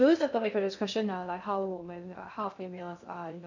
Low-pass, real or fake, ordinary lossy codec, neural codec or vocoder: 7.2 kHz; fake; none; codec, 16 kHz in and 24 kHz out, 0.6 kbps, FocalCodec, streaming, 2048 codes